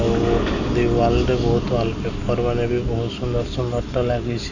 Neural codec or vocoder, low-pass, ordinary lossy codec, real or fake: none; 7.2 kHz; none; real